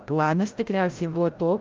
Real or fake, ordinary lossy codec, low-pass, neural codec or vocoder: fake; Opus, 32 kbps; 7.2 kHz; codec, 16 kHz, 0.5 kbps, FreqCodec, larger model